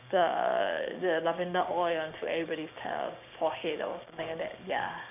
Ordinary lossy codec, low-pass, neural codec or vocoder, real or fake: none; 3.6 kHz; codec, 16 kHz, 6 kbps, DAC; fake